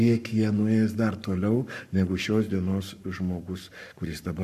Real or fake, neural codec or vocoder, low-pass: fake; codec, 44.1 kHz, 7.8 kbps, Pupu-Codec; 14.4 kHz